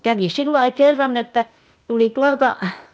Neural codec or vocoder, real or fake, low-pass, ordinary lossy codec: codec, 16 kHz, 0.8 kbps, ZipCodec; fake; none; none